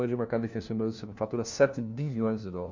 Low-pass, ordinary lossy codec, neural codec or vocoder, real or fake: 7.2 kHz; Opus, 64 kbps; codec, 16 kHz, 1 kbps, FunCodec, trained on LibriTTS, 50 frames a second; fake